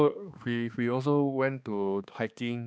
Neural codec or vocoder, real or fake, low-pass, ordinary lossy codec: codec, 16 kHz, 2 kbps, X-Codec, HuBERT features, trained on balanced general audio; fake; none; none